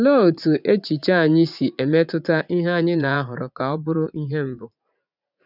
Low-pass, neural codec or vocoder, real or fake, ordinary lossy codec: 5.4 kHz; vocoder, 44.1 kHz, 80 mel bands, Vocos; fake; none